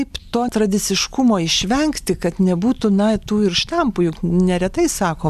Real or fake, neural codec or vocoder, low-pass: real; none; 14.4 kHz